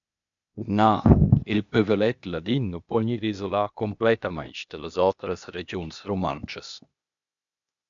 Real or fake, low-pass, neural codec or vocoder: fake; 7.2 kHz; codec, 16 kHz, 0.8 kbps, ZipCodec